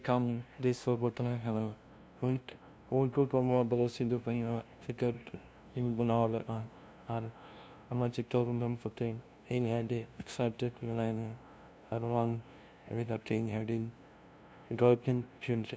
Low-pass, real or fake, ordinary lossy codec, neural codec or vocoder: none; fake; none; codec, 16 kHz, 0.5 kbps, FunCodec, trained on LibriTTS, 25 frames a second